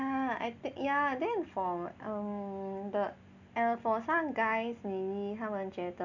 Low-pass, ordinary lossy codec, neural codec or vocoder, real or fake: 7.2 kHz; none; none; real